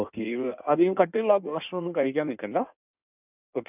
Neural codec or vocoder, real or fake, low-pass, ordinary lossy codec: codec, 16 kHz in and 24 kHz out, 1.1 kbps, FireRedTTS-2 codec; fake; 3.6 kHz; none